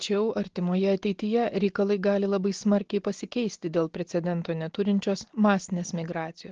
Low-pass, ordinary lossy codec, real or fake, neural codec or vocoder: 7.2 kHz; Opus, 16 kbps; real; none